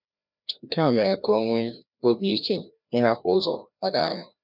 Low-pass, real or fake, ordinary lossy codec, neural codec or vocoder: 5.4 kHz; fake; none; codec, 16 kHz, 1 kbps, FreqCodec, larger model